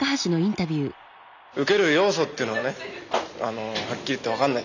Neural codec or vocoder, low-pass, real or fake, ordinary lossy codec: none; 7.2 kHz; real; none